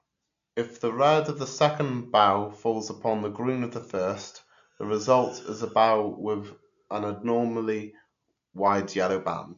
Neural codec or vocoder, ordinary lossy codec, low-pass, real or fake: none; MP3, 64 kbps; 7.2 kHz; real